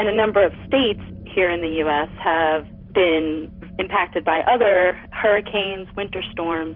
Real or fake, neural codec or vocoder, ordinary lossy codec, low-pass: fake; vocoder, 44.1 kHz, 128 mel bands every 512 samples, BigVGAN v2; Opus, 64 kbps; 5.4 kHz